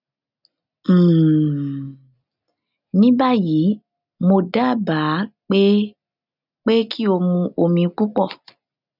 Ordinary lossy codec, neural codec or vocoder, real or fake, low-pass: none; none; real; 5.4 kHz